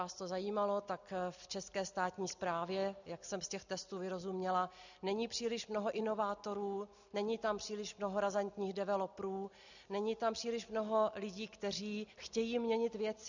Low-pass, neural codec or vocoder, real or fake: 7.2 kHz; none; real